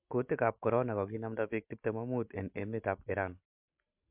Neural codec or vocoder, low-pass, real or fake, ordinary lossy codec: none; 3.6 kHz; real; MP3, 32 kbps